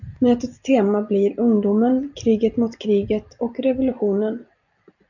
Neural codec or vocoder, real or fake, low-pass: none; real; 7.2 kHz